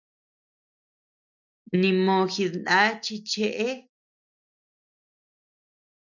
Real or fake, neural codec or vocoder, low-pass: real; none; 7.2 kHz